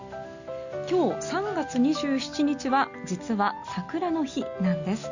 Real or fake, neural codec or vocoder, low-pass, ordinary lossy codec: real; none; 7.2 kHz; Opus, 64 kbps